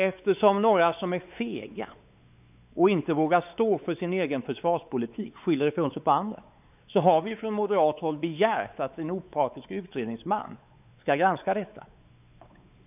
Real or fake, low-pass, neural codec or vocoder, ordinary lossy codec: fake; 3.6 kHz; codec, 16 kHz, 4 kbps, X-Codec, WavLM features, trained on Multilingual LibriSpeech; none